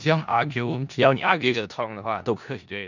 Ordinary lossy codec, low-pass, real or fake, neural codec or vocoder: none; 7.2 kHz; fake; codec, 16 kHz in and 24 kHz out, 0.4 kbps, LongCat-Audio-Codec, four codebook decoder